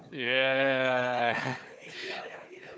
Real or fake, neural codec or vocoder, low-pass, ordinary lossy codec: fake; codec, 16 kHz, 4 kbps, FunCodec, trained on Chinese and English, 50 frames a second; none; none